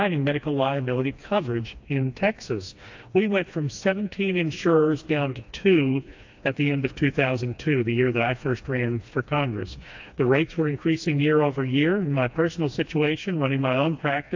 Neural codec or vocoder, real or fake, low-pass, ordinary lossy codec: codec, 16 kHz, 2 kbps, FreqCodec, smaller model; fake; 7.2 kHz; AAC, 48 kbps